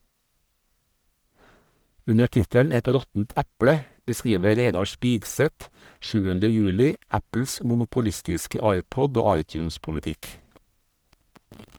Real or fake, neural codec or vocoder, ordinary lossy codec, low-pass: fake; codec, 44.1 kHz, 1.7 kbps, Pupu-Codec; none; none